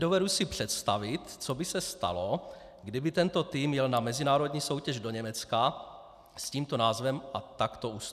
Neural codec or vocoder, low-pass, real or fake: none; 14.4 kHz; real